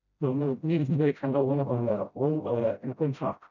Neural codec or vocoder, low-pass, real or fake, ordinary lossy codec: codec, 16 kHz, 0.5 kbps, FreqCodec, smaller model; 7.2 kHz; fake; none